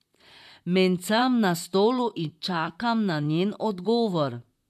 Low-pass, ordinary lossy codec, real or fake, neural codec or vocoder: 14.4 kHz; MP3, 96 kbps; fake; vocoder, 44.1 kHz, 128 mel bands, Pupu-Vocoder